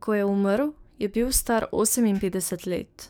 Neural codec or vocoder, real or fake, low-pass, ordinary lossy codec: codec, 44.1 kHz, 7.8 kbps, DAC; fake; none; none